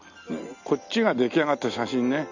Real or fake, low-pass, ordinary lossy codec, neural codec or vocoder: real; 7.2 kHz; none; none